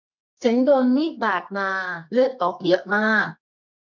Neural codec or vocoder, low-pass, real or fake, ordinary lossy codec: codec, 24 kHz, 0.9 kbps, WavTokenizer, medium music audio release; 7.2 kHz; fake; none